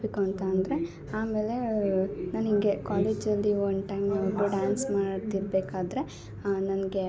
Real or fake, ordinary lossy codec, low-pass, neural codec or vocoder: real; none; none; none